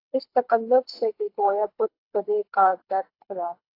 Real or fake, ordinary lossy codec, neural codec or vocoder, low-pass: fake; AAC, 32 kbps; codec, 24 kHz, 6 kbps, HILCodec; 5.4 kHz